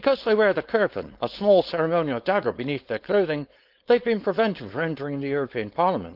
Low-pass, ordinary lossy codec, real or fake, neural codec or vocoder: 5.4 kHz; Opus, 24 kbps; fake; codec, 16 kHz, 4.8 kbps, FACodec